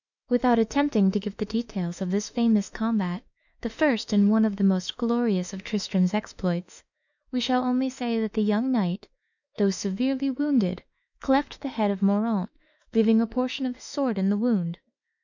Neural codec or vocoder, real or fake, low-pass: autoencoder, 48 kHz, 32 numbers a frame, DAC-VAE, trained on Japanese speech; fake; 7.2 kHz